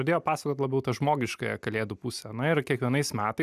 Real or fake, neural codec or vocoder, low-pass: real; none; 14.4 kHz